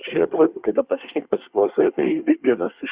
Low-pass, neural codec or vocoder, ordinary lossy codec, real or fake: 3.6 kHz; codec, 24 kHz, 1 kbps, SNAC; Opus, 64 kbps; fake